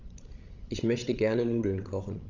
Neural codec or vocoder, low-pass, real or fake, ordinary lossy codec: codec, 16 kHz, 16 kbps, FreqCodec, larger model; 7.2 kHz; fake; Opus, 32 kbps